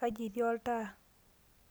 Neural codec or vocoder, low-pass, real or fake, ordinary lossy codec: none; none; real; none